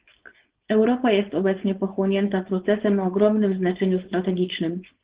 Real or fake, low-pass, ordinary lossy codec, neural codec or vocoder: fake; 3.6 kHz; Opus, 16 kbps; codec, 16 kHz, 4.8 kbps, FACodec